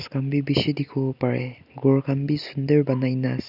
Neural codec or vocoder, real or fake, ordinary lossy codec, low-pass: none; real; none; 5.4 kHz